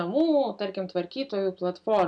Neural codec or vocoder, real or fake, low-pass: none; real; 9.9 kHz